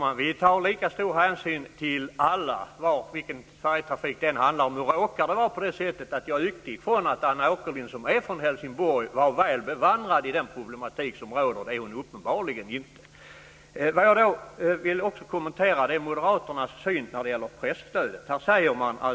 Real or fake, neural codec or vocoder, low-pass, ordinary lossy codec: real; none; none; none